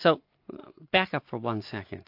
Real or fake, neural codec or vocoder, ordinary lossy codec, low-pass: real; none; AAC, 32 kbps; 5.4 kHz